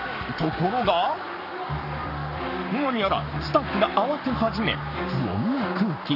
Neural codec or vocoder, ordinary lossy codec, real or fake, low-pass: codec, 44.1 kHz, 7.8 kbps, Pupu-Codec; none; fake; 5.4 kHz